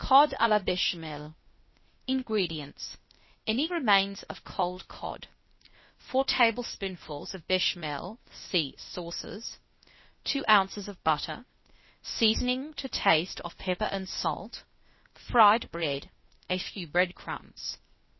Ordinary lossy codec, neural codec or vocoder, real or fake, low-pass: MP3, 24 kbps; codec, 16 kHz, 0.8 kbps, ZipCodec; fake; 7.2 kHz